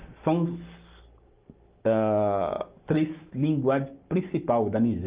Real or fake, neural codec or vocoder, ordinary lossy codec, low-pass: real; none; Opus, 24 kbps; 3.6 kHz